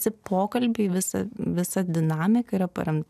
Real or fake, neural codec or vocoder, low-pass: real; none; 14.4 kHz